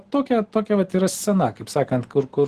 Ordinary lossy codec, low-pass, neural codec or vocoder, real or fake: Opus, 16 kbps; 14.4 kHz; none; real